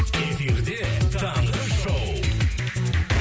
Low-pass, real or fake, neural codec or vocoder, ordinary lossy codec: none; real; none; none